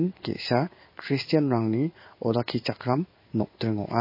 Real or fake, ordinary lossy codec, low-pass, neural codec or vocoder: fake; MP3, 24 kbps; 5.4 kHz; codec, 16 kHz in and 24 kHz out, 1 kbps, XY-Tokenizer